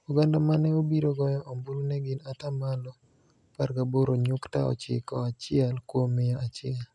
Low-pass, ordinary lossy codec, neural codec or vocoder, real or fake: 10.8 kHz; none; none; real